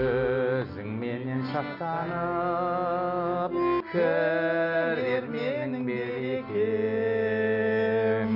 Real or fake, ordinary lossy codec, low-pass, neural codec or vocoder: real; none; 5.4 kHz; none